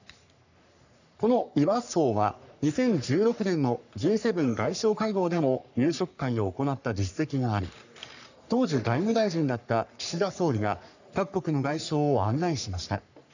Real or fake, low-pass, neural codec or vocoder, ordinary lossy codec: fake; 7.2 kHz; codec, 44.1 kHz, 3.4 kbps, Pupu-Codec; none